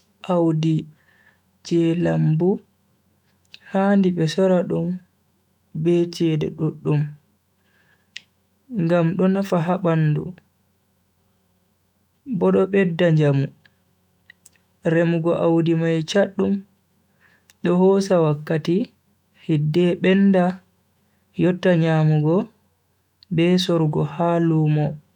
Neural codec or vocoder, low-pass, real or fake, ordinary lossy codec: autoencoder, 48 kHz, 128 numbers a frame, DAC-VAE, trained on Japanese speech; 19.8 kHz; fake; none